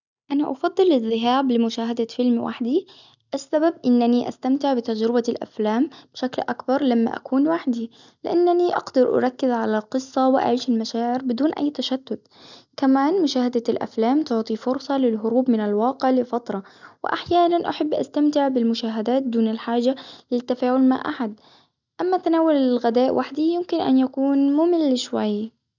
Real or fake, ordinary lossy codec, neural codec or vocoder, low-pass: real; none; none; 7.2 kHz